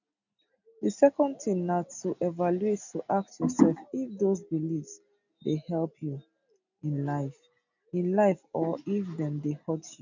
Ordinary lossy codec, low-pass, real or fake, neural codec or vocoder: none; 7.2 kHz; real; none